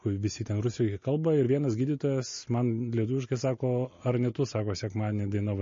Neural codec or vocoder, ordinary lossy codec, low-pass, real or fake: none; MP3, 32 kbps; 7.2 kHz; real